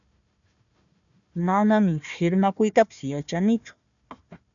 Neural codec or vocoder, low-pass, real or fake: codec, 16 kHz, 1 kbps, FunCodec, trained on Chinese and English, 50 frames a second; 7.2 kHz; fake